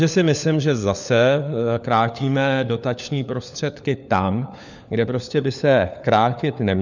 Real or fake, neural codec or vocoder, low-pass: fake; codec, 16 kHz, 4 kbps, FunCodec, trained on LibriTTS, 50 frames a second; 7.2 kHz